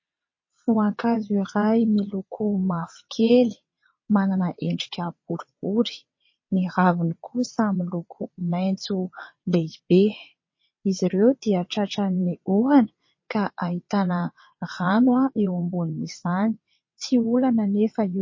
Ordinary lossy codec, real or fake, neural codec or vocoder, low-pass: MP3, 32 kbps; fake; vocoder, 22.05 kHz, 80 mel bands, WaveNeXt; 7.2 kHz